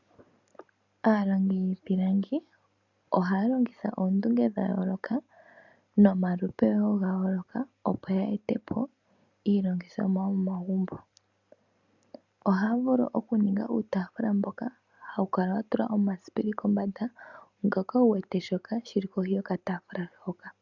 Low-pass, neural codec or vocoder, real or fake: 7.2 kHz; none; real